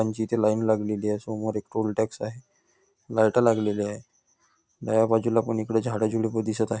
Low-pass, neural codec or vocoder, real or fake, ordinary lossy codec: none; none; real; none